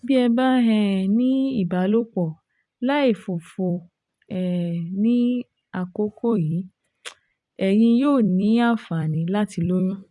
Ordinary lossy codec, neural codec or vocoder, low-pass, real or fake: none; vocoder, 44.1 kHz, 128 mel bands every 256 samples, BigVGAN v2; 10.8 kHz; fake